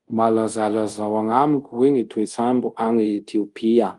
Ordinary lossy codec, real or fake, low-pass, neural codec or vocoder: Opus, 24 kbps; fake; 10.8 kHz; codec, 24 kHz, 0.5 kbps, DualCodec